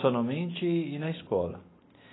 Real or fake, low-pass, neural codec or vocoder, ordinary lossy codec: real; 7.2 kHz; none; AAC, 16 kbps